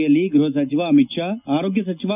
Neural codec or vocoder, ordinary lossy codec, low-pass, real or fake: none; none; 3.6 kHz; real